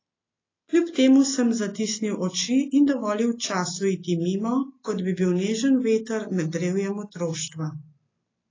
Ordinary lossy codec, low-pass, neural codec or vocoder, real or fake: AAC, 32 kbps; 7.2 kHz; none; real